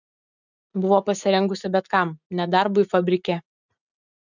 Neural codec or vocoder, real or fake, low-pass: none; real; 7.2 kHz